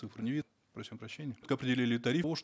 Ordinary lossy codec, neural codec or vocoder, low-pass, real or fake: none; none; none; real